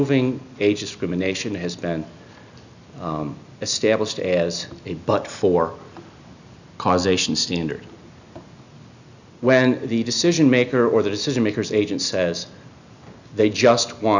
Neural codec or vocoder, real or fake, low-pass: none; real; 7.2 kHz